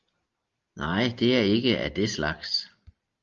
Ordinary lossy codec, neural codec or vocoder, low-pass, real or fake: Opus, 32 kbps; none; 7.2 kHz; real